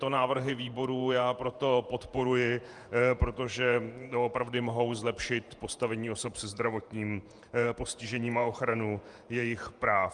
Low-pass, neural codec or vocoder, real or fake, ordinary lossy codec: 10.8 kHz; vocoder, 48 kHz, 128 mel bands, Vocos; fake; Opus, 32 kbps